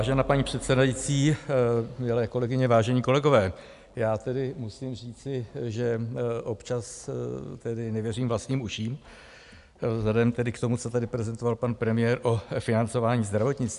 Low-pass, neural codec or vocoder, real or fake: 10.8 kHz; none; real